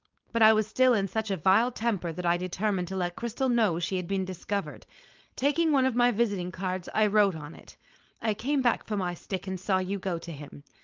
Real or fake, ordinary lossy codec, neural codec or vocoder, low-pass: fake; Opus, 32 kbps; codec, 16 kHz, 4.8 kbps, FACodec; 7.2 kHz